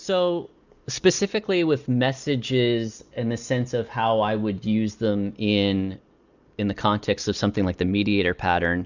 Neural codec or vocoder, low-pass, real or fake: none; 7.2 kHz; real